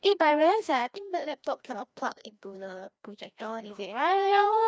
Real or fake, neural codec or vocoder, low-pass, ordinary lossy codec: fake; codec, 16 kHz, 1 kbps, FreqCodec, larger model; none; none